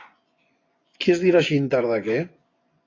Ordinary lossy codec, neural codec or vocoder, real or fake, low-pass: AAC, 32 kbps; vocoder, 24 kHz, 100 mel bands, Vocos; fake; 7.2 kHz